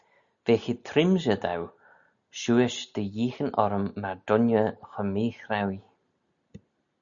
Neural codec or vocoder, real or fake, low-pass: none; real; 7.2 kHz